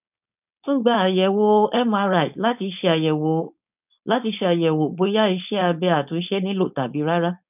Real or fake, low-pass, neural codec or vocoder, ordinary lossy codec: fake; 3.6 kHz; codec, 16 kHz, 4.8 kbps, FACodec; none